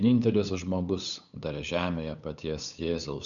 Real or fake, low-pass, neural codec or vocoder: fake; 7.2 kHz; codec, 16 kHz, 8 kbps, FunCodec, trained on LibriTTS, 25 frames a second